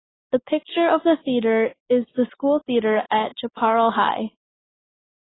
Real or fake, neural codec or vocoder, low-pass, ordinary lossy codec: real; none; 7.2 kHz; AAC, 16 kbps